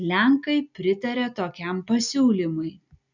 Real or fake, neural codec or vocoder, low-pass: real; none; 7.2 kHz